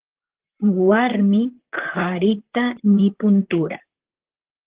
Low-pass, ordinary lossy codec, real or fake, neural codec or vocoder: 3.6 kHz; Opus, 16 kbps; fake; vocoder, 44.1 kHz, 128 mel bands, Pupu-Vocoder